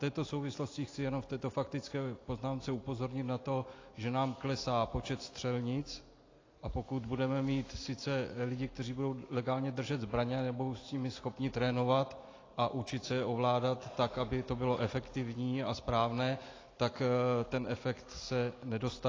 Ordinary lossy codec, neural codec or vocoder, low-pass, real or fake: AAC, 32 kbps; none; 7.2 kHz; real